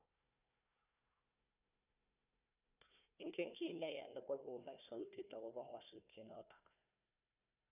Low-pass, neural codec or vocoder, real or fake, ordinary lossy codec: 3.6 kHz; codec, 16 kHz, 1 kbps, FunCodec, trained on Chinese and English, 50 frames a second; fake; none